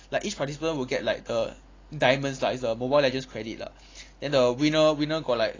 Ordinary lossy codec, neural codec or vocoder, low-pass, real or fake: AAC, 32 kbps; none; 7.2 kHz; real